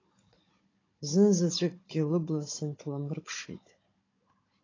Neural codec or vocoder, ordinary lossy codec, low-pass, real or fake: codec, 16 kHz, 16 kbps, FunCodec, trained on Chinese and English, 50 frames a second; AAC, 32 kbps; 7.2 kHz; fake